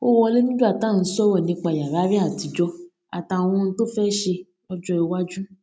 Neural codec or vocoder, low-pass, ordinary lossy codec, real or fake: none; none; none; real